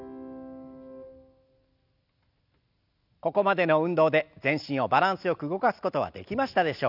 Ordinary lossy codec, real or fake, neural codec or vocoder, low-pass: none; real; none; 5.4 kHz